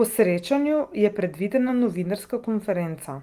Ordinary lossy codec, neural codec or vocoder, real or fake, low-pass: Opus, 32 kbps; none; real; 14.4 kHz